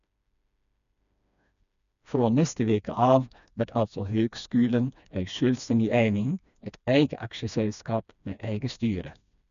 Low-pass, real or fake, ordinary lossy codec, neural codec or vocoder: 7.2 kHz; fake; none; codec, 16 kHz, 2 kbps, FreqCodec, smaller model